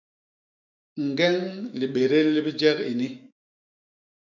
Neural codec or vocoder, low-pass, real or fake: autoencoder, 48 kHz, 128 numbers a frame, DAC-VAE, trained on Japanese speech; 7.2 kHz; fake